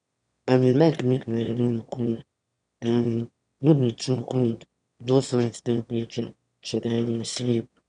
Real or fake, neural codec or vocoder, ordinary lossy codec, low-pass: fake; autoencoder, 22.05 kHz, a latent of 192 numbers a frame, VITS, trained on one speaker; none; 9.9 kHz